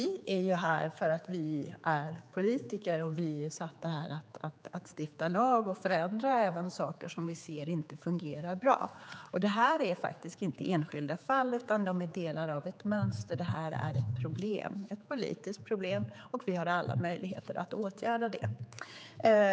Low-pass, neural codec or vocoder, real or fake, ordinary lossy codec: none; codec, 16 kHz, 4 kbps, X-Codec, HuBERT features, trained on general audio; fake; none